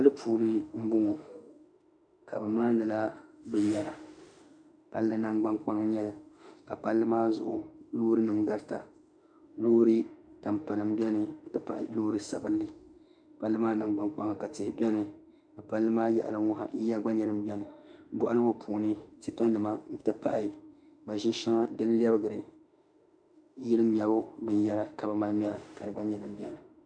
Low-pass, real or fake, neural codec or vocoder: 9.9 kHz; fake; autoencoder, 48 kHz, 32 numbers a frame, DAC-VAE, trained on Japanese speech